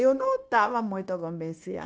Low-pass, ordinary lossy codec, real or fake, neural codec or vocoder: none; none; fake; codec, 16 kHz, 0.9 kbps, LongCat-Audio-Codec